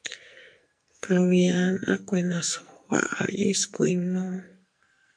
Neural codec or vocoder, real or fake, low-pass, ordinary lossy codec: codec, 44.1 kHz, 2.6 kbps, SNAC; fake; 9.9 kHz; MP3, 96 kbps